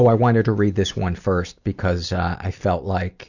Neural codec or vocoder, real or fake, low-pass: none; real; 7.2 kHz